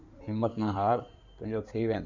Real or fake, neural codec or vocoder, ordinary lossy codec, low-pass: fake; codec, 16 kHz in and 24 kHz out, 2.2 kbps, FireRedTTS-2 codec; none; 7.2 kHz